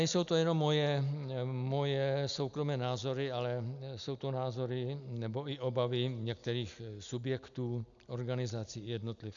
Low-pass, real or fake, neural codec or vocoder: 7.2 kHz; real; none